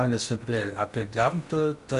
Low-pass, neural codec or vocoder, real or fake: 10.8 kHz; codec, 16 kHz in and 24 kHz out, 0.8 kbps, FocalCodec, streaming, 65536 codes; fake